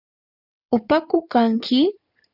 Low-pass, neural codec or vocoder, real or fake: 5.4 kHz; codec, 16 kHz in and 24 kHz out, 2.2 kbps, FireRedTTS-2 codec; fake